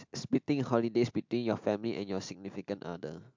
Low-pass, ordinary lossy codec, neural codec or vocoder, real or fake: 7.2 kHz; MP3, 64 kbps; none; real